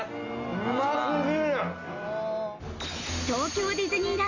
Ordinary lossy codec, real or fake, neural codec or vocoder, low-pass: none; real; none; 7.2 kHz